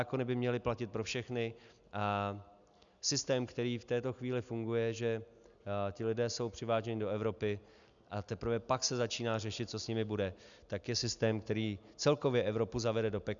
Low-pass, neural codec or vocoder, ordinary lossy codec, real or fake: 7.2 kHz; none; MP3, 96 kbps; real